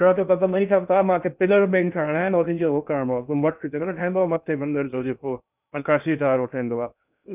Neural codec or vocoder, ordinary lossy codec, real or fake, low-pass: codec, 16 kHz in and 24 kHz out, 0.6 kbps, FocalCodec, streaming, 2048 codes; none; fake; 3.6 kHz